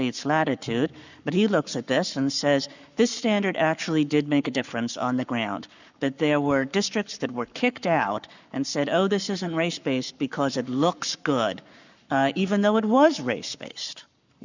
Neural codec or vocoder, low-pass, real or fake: codec, 44.1 kHz, 7.8 kbps, Pupu-Codec; 7.2 kHz; fake